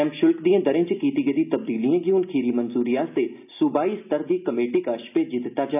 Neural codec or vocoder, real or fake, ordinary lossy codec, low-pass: none; real; none; 3.6 kHz